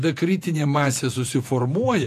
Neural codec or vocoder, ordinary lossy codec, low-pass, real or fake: vocoder, 48 kHz, 128 mel bands, Vocos; AAC, 64 kbps; 14.4 kHz; fake